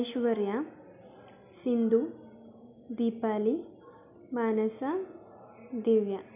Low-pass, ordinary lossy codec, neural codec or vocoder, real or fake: 3.6 kHz; none; none; real